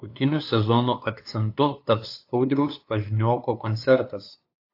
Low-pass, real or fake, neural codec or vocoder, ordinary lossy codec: 5.4 kHz; fake; codec, 16 kHz, 2 kbps, FunCodec, trained on LibriTTS, 25 frames a second; AAC, 32 kbps